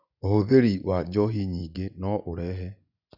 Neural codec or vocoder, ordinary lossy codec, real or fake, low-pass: vocoder, 24 kHz, 100 mel bands, Vocos; none; fake; 5.4 kHz